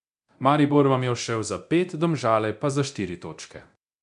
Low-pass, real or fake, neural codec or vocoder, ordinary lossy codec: 10.8 kHz; fake; codec, 24 kHz, 0.9 kbps, DualCodec; none